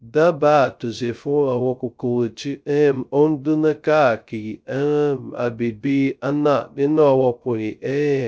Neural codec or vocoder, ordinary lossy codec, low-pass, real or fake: codec, 16 kHz, 0.2 kbps, FocalCodec; none; none; fake